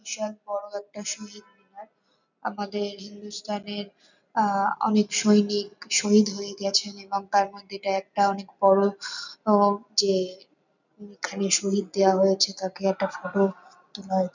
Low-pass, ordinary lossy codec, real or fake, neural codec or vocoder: 7.2 kHz; none; real; none